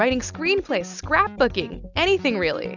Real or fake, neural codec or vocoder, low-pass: real; none; 7.2 kHz